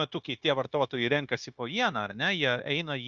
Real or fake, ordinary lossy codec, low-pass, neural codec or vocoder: fake; Opus, 64 kbps; 7.2 kHz; codec, 16 kHz, 0.9 kbps, LongCat-Audio-Codec